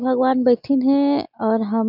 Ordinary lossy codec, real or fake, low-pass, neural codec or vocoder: none; real; 5.4 kHz; none